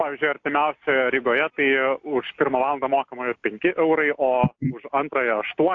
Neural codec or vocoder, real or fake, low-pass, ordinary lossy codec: none; real; 7.2 kHz; AAC, 48 kbps